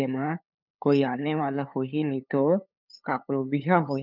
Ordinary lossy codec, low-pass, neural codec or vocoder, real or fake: none; 5.4 kHz; codec, 16 kHz, 8 kbps, FunCodec, trained on LibriTTS, 25 frames a second; fake